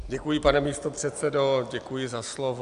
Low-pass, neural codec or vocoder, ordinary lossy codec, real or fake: 10.8 kHz; none; AAC, 96 kbps; real